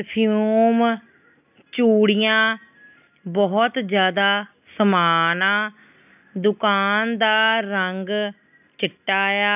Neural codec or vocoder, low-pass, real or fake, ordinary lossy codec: none; 3.6 kHz; real; none